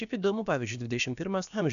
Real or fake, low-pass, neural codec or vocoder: fake; 7.2 kHz; codec, 16 kHz, about 1 kbps, DyCAST, with the encoder's durations